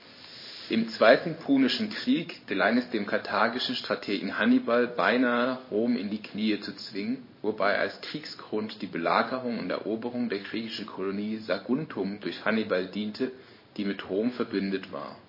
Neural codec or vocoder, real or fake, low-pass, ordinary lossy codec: codec, 16 kHz in and 24 kHz out, 1 kbps, XY-Tokenizer; fake; 5.4 kHz; MP3, 24 kbps